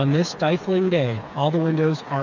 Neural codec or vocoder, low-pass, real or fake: codec, 16 kHz, 4 kbps, FreqCodec, smaller model; 7.2 kHz; fake